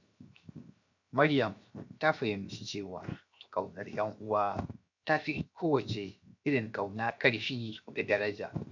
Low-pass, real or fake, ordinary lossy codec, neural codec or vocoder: 7.2 kHz; fake; MP3, 64 kbps; codec, 16 kHz, 0.7 kbps, FocalCodec